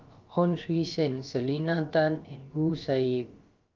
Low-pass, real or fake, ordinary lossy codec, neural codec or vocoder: 7.2 kHz; fake; Opus, 24 kbps; codec, 16 kHz, about 1 kbps, DyCAST, with the encoder's durations